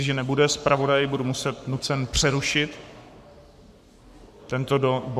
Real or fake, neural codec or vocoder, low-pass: fake; codec, 44.1 kHz, 7.8 kbps, Pupu-Codec; 14.4 kHz